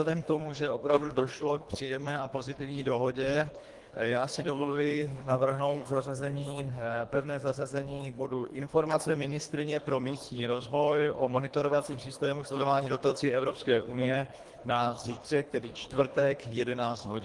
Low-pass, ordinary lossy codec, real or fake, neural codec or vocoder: 10.8 kHz; Opus, 24 kbps; fake; codec, 24 kHz, 1.5 kbps, HILCodec